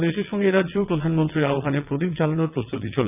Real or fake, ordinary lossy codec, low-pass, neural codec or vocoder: fake; none; 3.6 kHz; vocoder, 22.05 kHz, 80 mel bands, WaveNeXt